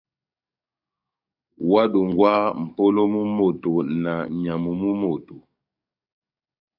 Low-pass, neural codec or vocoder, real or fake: 5.4 kHz; codec, 44.1 kHz, 7.8 kbps, DAC; fake